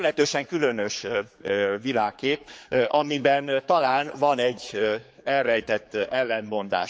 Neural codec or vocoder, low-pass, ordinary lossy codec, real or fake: codec, 16 kHz, 4 kbps, X-Codec, HuBERT features, trained on general audio; none; none; fake